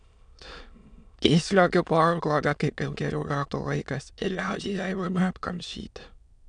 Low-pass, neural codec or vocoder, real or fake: 9.9 kHz; autoencoder, 22.05 kHz, a latent of 192 numbers a frame, VITS, trained on many speakers; fake